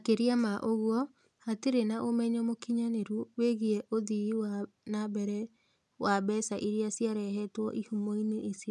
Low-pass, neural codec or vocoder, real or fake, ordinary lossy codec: none; none; real; none